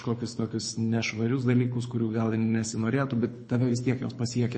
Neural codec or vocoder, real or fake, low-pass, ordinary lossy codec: codec, 24 kHz, 6 kbps, HILCodec; fake; 9.9 kHz; MP3, 32 kbps